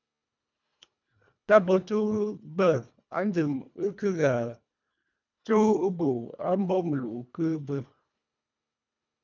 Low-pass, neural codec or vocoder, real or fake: 7.2 kHz; codec, 24 kHz, 1.5 kbps, HILCodec; fake